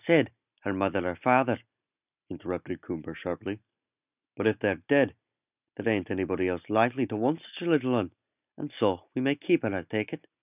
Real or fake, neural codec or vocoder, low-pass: real; none; 3.6 kHz